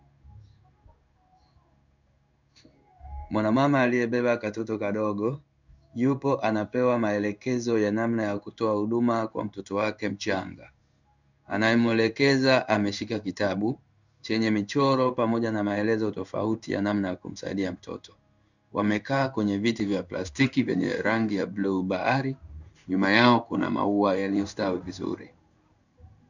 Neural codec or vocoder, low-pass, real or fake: codec, 16 kHz in and 24 kHz out, 1 kbps, XY-Tokenizer; 7.2 kHz; fake